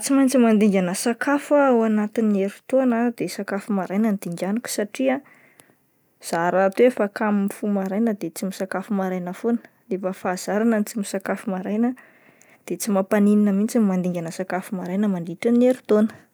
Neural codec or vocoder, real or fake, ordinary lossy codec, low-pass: none; real; none; none